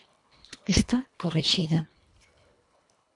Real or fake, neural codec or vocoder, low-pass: fake; codec, 24 kHz, 1.5 kbps, HILCodec; 10.8 kHz